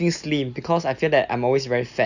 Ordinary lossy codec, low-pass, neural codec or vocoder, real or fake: none; 7.2 kHz; none; real